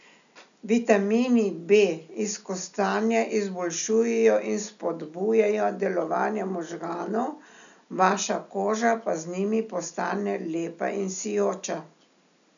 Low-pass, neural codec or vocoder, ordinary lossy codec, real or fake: 7.2 kHz; none; none; real